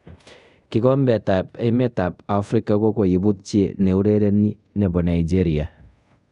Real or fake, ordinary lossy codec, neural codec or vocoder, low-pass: fake; none; codec, 24 kHz, 0.5 kbps, DualCodec; 10.8 kHz